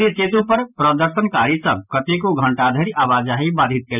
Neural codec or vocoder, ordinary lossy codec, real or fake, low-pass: none; none; real; 3.6 kHz